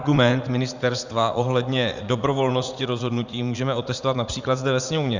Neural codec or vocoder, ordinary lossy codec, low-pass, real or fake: vocoder, 44.1 kHz, 80 mel bands, Vocos; Opus, 64 kbps; 7.2 kHz; fake